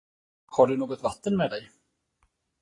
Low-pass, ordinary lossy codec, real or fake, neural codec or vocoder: 10.8 kHz; AAC, 32 kbps; real; none